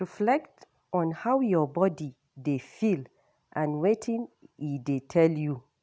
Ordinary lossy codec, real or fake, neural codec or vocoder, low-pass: none; real; none; none